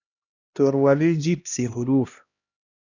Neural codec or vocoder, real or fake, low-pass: codec, 16 kHz, 1 kbps, X-Codec, HuBERT features, trained on LibriSpeech; fake; 7.2 kHz